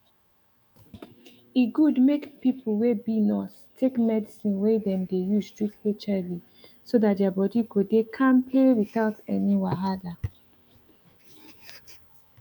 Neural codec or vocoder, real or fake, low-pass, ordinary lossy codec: autoencoder, 48 kHz, 128 numbers a frame, DAC-VAE, trained on Japanese speech; fake; 19.8 kHz; none